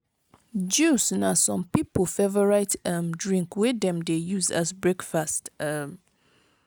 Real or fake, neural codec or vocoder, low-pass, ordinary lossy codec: real; none; none; none